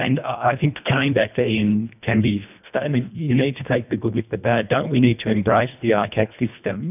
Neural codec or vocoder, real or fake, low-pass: codec, 24 kHz, 1.5 kbps, HILCodec; fake; 3.6 kHz